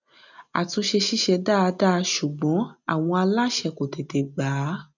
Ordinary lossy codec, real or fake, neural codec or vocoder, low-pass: none; real; none; 7.2 kHz